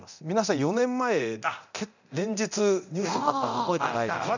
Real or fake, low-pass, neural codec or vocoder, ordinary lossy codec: fake; 7.2 kHz; codec, 24 kHz, 0.9 kbps, DualCodec; none